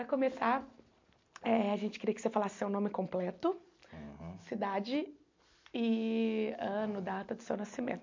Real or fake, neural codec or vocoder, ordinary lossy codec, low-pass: real; none; MP3, 48 kbps; 7.2 kHz